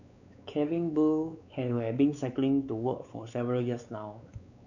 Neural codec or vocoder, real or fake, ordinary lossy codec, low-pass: codec, 16 kHz, 4 kbps, X-Codec, WavLM features, trained on Multilingual LibriSpeech; fake; none; 7.2 kHz